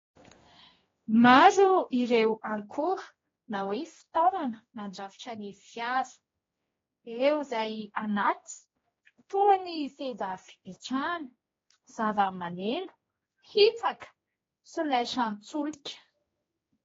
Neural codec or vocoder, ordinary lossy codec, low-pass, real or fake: codec, 16 kHz, 1 kbps, X-Codec, HuBERT features, trained on general audio; AAC, 24 kbps; 7.2 kHz; fake